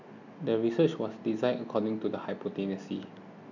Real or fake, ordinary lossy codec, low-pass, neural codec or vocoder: real; none; 7.2 kHz; none